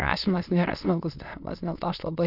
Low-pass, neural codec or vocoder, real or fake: 5.4 kHz; autoencoder, 22.05 kHz, a latent of 192 numbers a frame, VITS, trained on many speakers; fake